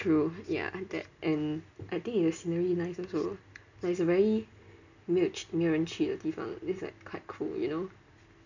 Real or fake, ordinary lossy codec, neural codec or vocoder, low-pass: real; none; none; 7.2 kHz